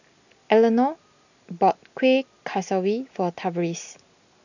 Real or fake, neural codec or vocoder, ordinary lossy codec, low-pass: real; none; none; 7.2 kHz